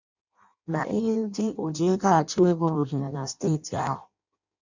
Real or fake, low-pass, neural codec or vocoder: fake; 7.2 kHz; codec, 16 kHz in and 24 kHz out, 0.6 kbps, FireRedTTS-2 codec